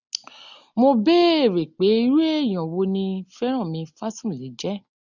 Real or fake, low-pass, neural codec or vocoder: real; 7.2 kHz; none